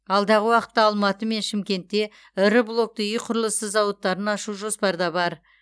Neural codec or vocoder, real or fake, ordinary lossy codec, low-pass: none; real; none; none